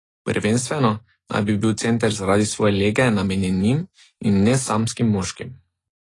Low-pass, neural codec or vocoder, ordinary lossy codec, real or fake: 10.8 kHz; none; AAC, 32 kbps; real